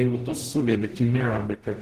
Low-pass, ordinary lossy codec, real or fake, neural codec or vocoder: 14.4 kHz; Opus, 16 kbps; fake; codec, 44.1 kHz, 0.9 kbps, DAC